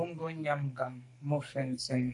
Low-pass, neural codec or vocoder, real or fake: 10.8 kHz; codec, 44.1 kHz, 2.6 kbps, SNAC; fake